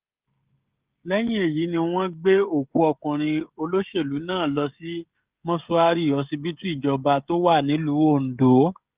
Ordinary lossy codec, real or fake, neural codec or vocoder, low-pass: Opus, 32 kbps; fake; codec, 16 kHz, 16 kbps, FreqCodec, smaller model; 3.6 kHz